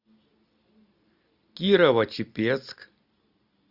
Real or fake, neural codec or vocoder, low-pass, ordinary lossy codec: real; none; 5.4 kHz; Opus, 64 kbps